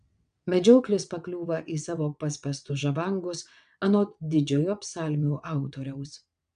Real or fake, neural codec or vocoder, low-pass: fake; vocoder, 22.05 kHz, 80 mel bands, WaveNeXt; 9.9 kHz